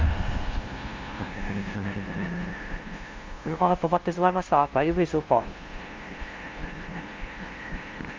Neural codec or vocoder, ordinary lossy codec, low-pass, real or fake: codec, 16 kHz, 0.5 kbps, FunCodec, trained on LibriTTS, 25 frames a second; Opus, 32 kbps; 7.2 kHz; fake